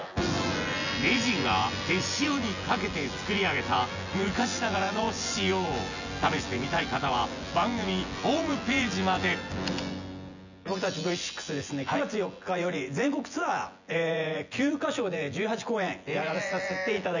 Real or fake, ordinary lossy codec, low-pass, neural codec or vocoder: fake; none; 7.2 kHz; vocoder, 24 kHz, 100 mel bands, Vocos